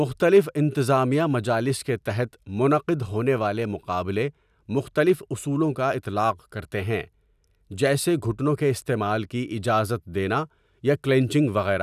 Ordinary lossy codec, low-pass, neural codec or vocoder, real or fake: none; 14.4 kHz; none; real